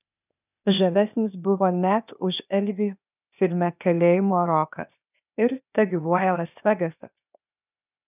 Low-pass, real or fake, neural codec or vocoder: 3.6 kHz; fake; codec, 16 kHz, 0.8 kbps, ZipCodec